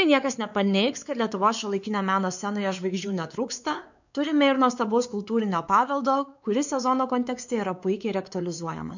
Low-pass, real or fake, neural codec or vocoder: 7.2 kHz; fake; codec, 16 kHz, 4 kbps, X-Codec, WavLM features, trained on Multilingual LibriSpeech